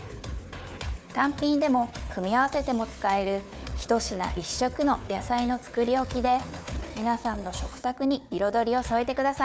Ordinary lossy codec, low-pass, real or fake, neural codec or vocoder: none; none; fake; codec, 16 kHz, 4 kbps, FunCodec, trained on Chinese and English, 50 frames a second